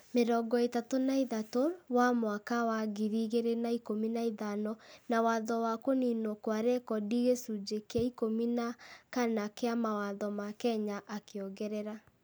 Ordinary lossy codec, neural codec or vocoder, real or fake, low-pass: none; none; real; none